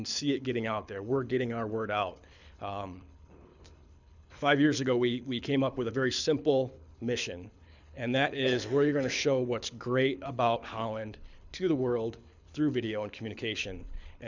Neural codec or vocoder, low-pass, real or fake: codec, 24 kHz, 6 kbps, HILCodec; 7.2 kHz; fake